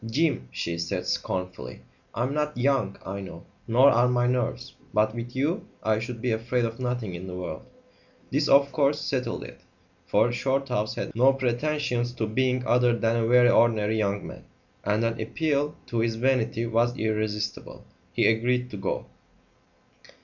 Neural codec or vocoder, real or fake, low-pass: none; real; 7.2 kHz